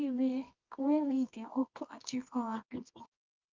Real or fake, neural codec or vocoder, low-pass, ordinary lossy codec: fake; codec, 24 kHz, 0.9 kbps, WavTokenizer, medium music audio release; 7.2 kHz; Opus, 24 kbps